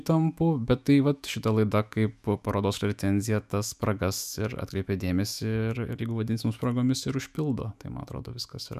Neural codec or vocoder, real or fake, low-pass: autoencoder, 48 kHz, 128 numbers a frame, DAC-VAE, trained on Japanese speech; fake; 14.4 kHz